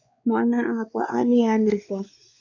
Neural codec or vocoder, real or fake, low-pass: codec, 16 kHz, 2 kbps, X-Codec, WavLM features, trained on Multilingual LibriSpeech; fake; 7.2 kHz